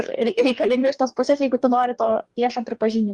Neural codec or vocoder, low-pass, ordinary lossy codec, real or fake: codec, 44.1 kHz, 2.6 kbps, DAC; 10.8 kHz; Opus, 32 kbps; fake